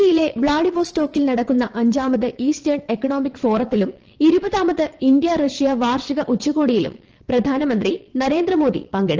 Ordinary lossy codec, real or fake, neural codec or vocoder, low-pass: Opus, 16 kbps; fake; vocoder, 22.05 kHz, 80 mel bands, WaveNeXt; 7.2 kHz